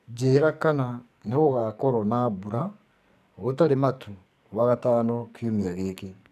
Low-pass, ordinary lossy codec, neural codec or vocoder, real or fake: 14.4 kHz; none; codec, 44.1 kHz, 2.6 kbps, SNAC; fake